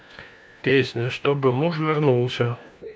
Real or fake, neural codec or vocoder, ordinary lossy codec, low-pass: fake; codec, 16 kHz, 1 kbps, FunCodec, trained on LibriTTS, 50 frames a second; none; none